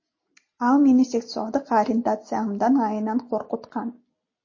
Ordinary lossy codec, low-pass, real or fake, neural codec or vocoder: MP3, 32 kbps; 7.2 kHz; real; none